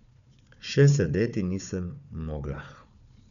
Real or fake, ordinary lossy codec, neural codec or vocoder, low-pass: fake; none; codec, 16 kHz, 4 kbps, FunCodec, trained on Chinese and English, 50 frames a second; 7.2 kHz